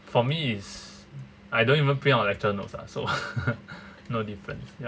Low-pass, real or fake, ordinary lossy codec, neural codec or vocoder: none; real; none; none